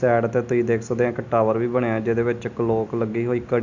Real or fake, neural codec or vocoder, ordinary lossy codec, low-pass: real; none; none; 7.2 kHz